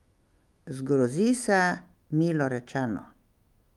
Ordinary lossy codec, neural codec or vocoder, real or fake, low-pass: Opus, 32 kbps; autoencoder, 48 kHz, 128 numbers a frame, DAC-VAE, trained on Japanese speech; fake; 19.8 kHz